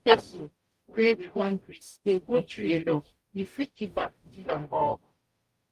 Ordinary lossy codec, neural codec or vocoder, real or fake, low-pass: Opus, 32 kbps; codec, 44.1 kHz, 0.9 kbps, DAC; fake; 14.4 kHz